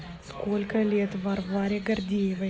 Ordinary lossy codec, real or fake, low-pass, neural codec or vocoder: none; real; none; none